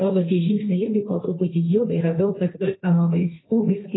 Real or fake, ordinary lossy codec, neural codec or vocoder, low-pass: fake; AAC, 16 kbps; codec, 16 kHz, 1.1 kbps, Voila-Tokenizer; 7.2 kHz